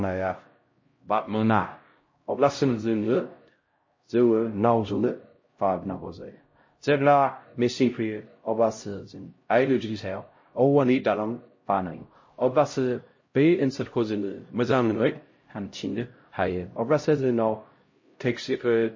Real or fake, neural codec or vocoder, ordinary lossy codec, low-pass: fake; codec, 16 kHz, 0.5 kbps, X-Codec, HuBERT features, trained on LibriSpeech; MP3, 32 kbps; 7.2 kHz